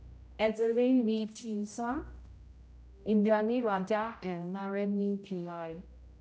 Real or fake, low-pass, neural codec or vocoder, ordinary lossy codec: fake; none; codec, 16 kHz, 0.5 kbps, X-Codec, HuBERT features, trained on general audio; none